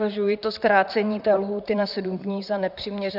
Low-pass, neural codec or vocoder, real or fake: 5.4 kHz; vocoder, 44.1 kHz, 128 mel bands, Pupu-Vocoder; fake